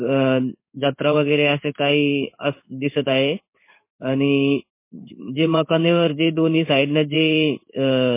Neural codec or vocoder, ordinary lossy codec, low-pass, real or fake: codec, 16 kHz in and 24 kHz out, 1 kbps, XY-Tokenizer; MP3, 24 kbps; 3.6 kHz; fake